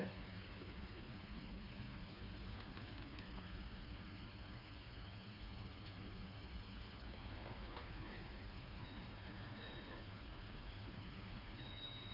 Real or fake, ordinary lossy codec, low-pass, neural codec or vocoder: fake; none; 5.4 kHz; codec, 16 kHz, 4 kbps, FreqCodec, smaller model